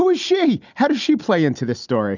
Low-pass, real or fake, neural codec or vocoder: 7.2 kHz; real; none